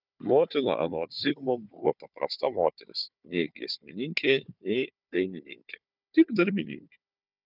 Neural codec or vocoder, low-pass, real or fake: codec, 16 kHz, 4 kbps, FunCodec, trained on Chinese and English, 50 frames a second; 5.4 kHz; fake